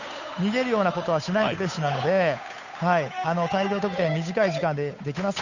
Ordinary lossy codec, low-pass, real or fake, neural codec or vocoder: none; 7.2 kHz; fake; codec, 16 kHz, 8 kbps, FunCodec, trained on Chinese and English, 25 frames a second